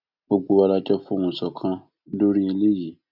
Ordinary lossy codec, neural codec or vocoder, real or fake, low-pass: none; none; real; 5.4 kHz